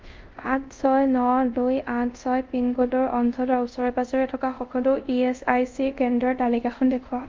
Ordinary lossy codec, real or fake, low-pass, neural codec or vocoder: Opus, 32 kbps; fake; 7.2 kHz; codec, 24 kHz, 0.5 kbps, DualCodec